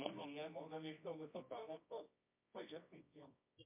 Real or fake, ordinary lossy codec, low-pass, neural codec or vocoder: fake; MP3, 32 kbps; 3.6 kHz; codec, 24 kHz, 0.9 kbps, WavTokenizer, medium music audio release